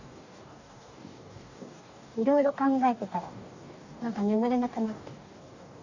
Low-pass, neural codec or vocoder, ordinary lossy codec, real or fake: 7.2 kHz; codec, 44.1 kHz, 2.6 kbps, DAC; none; fake